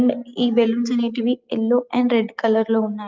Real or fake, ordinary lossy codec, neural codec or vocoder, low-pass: real; Opus, 32 kbps; none; 7.2 kHz